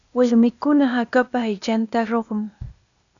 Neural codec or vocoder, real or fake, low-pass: codec, 16 kHz, 0.8 kbps, ZipCodec; fake; 7.2 kHz